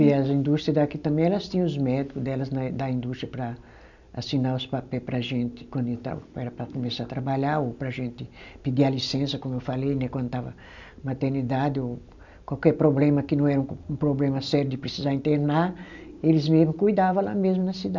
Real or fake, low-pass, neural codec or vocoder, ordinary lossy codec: real; 7.2 kHz; none; none